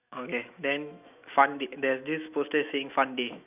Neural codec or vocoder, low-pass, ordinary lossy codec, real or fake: autoencoder, 48 kHz, 128 numbers a frame, DAC-VAE, trained on Japanese speech; 3.6 kHz; none; fake